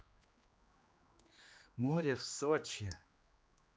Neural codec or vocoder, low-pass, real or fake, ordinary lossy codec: codec, 16 kHz, 2 kbps, X-Codec, HuBERT features, trained on general audio; none; fake; none